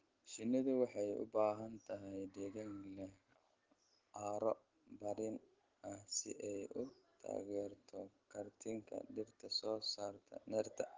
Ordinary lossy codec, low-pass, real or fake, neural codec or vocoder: Opus, 16 kbps; 7.2 kHz; real; none